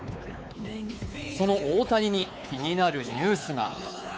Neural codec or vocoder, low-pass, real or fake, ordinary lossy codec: codec, 16 kHz, 4 kbps, X-Codec, WavLM features, trained on Multilingual LibriSpeech; none; fake; none